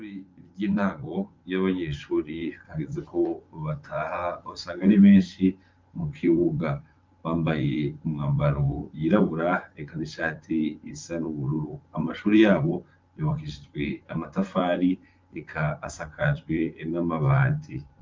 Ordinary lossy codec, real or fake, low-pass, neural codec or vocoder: Opus, 24 kbps; fake; 7.2 kHz; vocoder, 24 kHz, 100 mel bands, Vocos